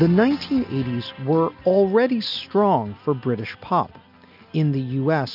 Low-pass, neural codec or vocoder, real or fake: 5.4 kHz; none; real